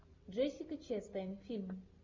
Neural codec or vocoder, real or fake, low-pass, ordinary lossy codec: none; real; 7.2 kHz; MP3, 48 kbps